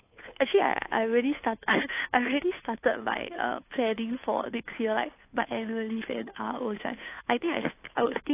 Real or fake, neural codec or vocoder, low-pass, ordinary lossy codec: fake; codec, 16 kHz, 2 kbps, FunCodec, trained on Chinese and English, 25 frames a second; 3.6 kHz; AAC, 24 kbps